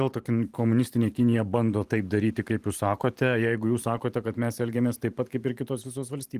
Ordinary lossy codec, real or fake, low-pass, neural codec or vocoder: Opus, 24 kbps; fake; 14.4 kHz; vocoder, 44.1 kHz, 128 mel bands every 512 samples, BigVGAN v2